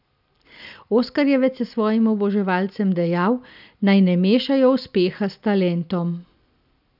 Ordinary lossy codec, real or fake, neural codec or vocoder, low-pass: none; real; none; 5.4 kHz